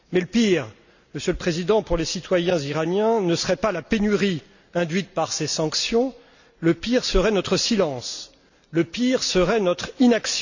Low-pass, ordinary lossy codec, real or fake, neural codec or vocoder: 7.2 kHz; none; real; none